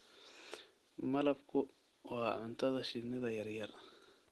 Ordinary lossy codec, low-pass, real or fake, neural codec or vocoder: Opus, 16 kbps; 14.4 kHz; real; none